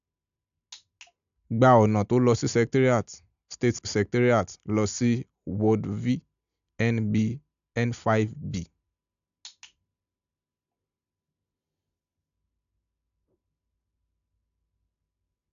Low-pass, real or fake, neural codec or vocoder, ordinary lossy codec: 7.2 kHz; real; none; AAC, 96 kbps